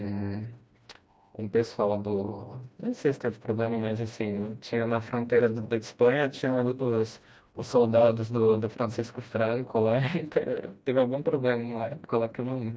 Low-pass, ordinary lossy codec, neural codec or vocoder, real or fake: none; none; codec, 16 kHz, 1 kbps, FreqCodec, smaller model; fake